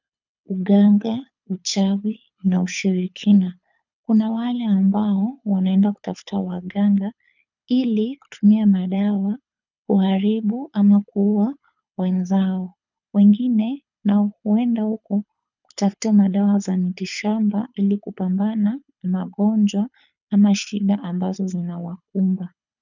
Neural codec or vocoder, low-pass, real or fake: codec, 24 kHz, 6 kbps, HILCodec; 7.2 kHz; fake